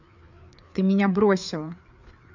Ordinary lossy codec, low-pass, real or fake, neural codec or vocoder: none; 7.2 kHz; fake; codec, 16 kHz, 4 kbps, FreqCodec, larger model